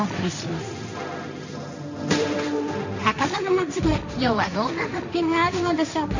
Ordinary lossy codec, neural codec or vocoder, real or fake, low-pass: none; codec, 16 kHz, 1.1 kbps, Voila-Tokenizer; fake; 7.2 kHz